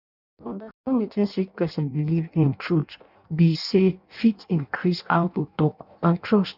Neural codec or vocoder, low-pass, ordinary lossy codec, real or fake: codec, 16 kHz in and 24 kHz out, 0.6 kbps, FireRedTTS-2 codec; 5.4 kHz; none; fake